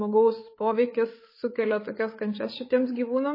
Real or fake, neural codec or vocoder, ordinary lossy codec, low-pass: fake; codec, 16 kHz, 8 kbps, FreqCodec, larger model; MP3, 24 kbps; 5.4 kHz